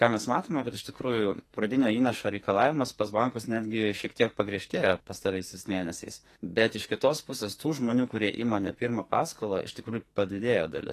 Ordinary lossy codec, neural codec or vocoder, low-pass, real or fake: AAC, 48 kbps; codec, 44.1 kHz, 2.6 kbps, SNAC; 14.4 kHz; fake